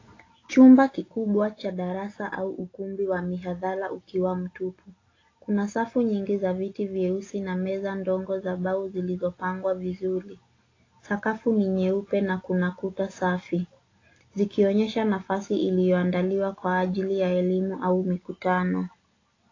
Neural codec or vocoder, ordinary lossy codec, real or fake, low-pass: none; AAC, 32 kbps; real; 7.2 kHz